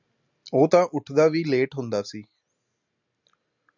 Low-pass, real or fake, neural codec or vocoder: 7.2 kHz; real; none